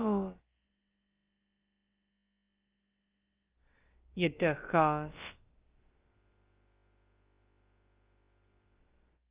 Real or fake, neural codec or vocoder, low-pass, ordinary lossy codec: fake; codec, 16 kHz, about 1 kbps, DyCAST, with the encoder's durations; 3.6 kHz; Opus, 32 kbps